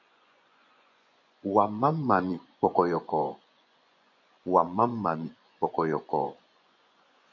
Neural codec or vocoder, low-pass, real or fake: vocoder, 24 kHz, 100 mel bands, Vocos; 7.2 kHz; fake